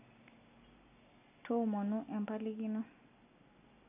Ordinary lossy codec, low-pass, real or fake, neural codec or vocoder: none; 3.6 kHz; real; none